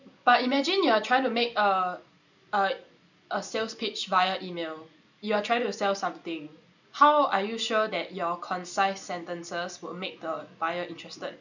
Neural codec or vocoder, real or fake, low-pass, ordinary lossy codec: none; real; 7.2 kHz; none